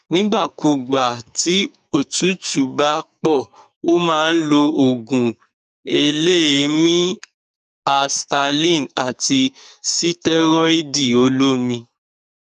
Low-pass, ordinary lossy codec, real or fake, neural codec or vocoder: 14.4 kHz; none; fake; codec, 44.1 kHz, 2.6 kbps, SNAC